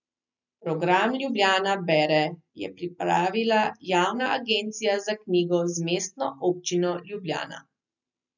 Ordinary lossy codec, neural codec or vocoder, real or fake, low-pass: none; none; real; 7.2 kHz